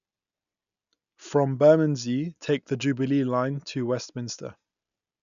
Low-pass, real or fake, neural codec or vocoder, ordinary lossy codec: 7.2 kHz; real; none; none